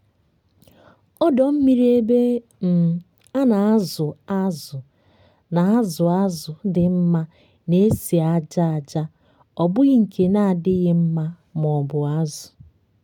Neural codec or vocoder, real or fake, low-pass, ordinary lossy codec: none; real; 19.8 kHz; none